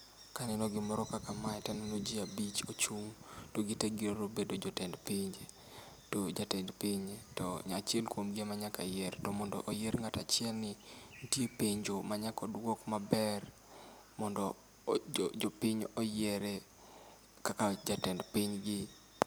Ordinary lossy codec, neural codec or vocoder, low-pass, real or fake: none; none; none; real